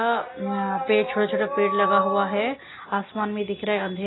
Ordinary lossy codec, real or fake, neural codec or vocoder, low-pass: AAC, 16 kbps; real; none; 7.2 kHz